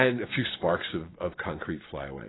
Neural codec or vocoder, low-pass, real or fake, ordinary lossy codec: none; 7.2 kHz; real; AAC, 16 kbps